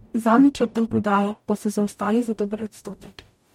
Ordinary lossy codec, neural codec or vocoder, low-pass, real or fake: MP3, 64 kbps; codec, 44.1 kHz, 0.9 kbps, DAC; 19.8 kHz; fake